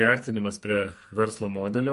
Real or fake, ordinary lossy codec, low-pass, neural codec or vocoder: fake; MP3, 48 kbps; 14.4 kHz; codec, 44.1 kHz, 2.6 kbps, SNAC